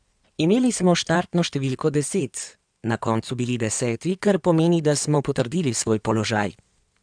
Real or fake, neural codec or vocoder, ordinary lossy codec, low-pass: fake; codec, 16 kHz in and 24 kHz out, 2.2 kbps, FireRedTTS-2 codec; none; 9.9 kHz